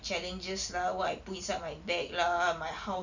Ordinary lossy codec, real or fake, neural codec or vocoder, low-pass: none; fake; vocoder, 44.1 kHz, 128 mel bands every 256 samples, BigVGAN v2; 7.2 kHz